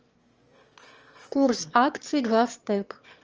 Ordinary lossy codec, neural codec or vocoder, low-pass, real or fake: Opus, 24 kbps; autoencoder, 22.05 kHz, a latent of 192 numbers a frame, VITS, trained on one speaker; 7.2 kHz; fake